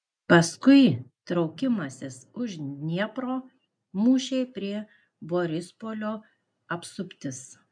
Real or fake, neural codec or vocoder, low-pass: real; none; 9.9 kHz